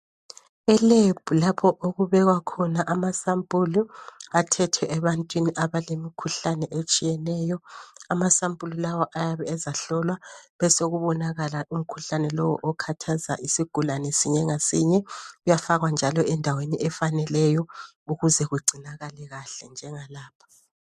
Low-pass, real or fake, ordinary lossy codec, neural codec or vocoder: 14.4 kHz; real; MP3, 64 kbps; none